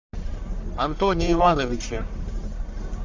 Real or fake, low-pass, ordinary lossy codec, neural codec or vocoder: fake; 7.2 kHz; MP3, 64 kbps; codec, 44.1 kHz, 1.7 kbps, Pupu-Codec